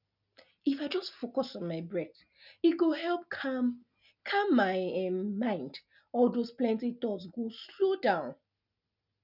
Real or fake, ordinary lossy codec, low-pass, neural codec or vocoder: real; none; 5.4 kHz; none